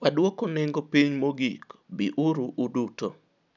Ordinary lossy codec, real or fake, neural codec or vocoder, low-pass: none; real; none; 7.2 kHz